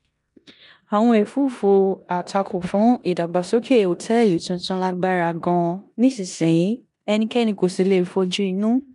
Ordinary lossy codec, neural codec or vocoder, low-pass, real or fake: none; codec, 16 kHz in and 24 kHz out, 0.9 kbps, LongCat-Audio-Codec, four codebook decoder; 10.8 kHz; fake